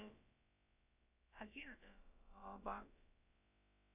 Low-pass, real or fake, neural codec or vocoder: 3.6 kHz; fake; codec, 16 kHz, about 1 kbps, DyCAST, with the encoder's durations